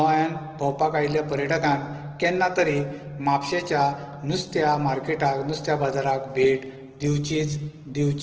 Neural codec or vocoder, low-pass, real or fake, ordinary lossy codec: none; 7.2 kHz; real; Opus, 16 kbps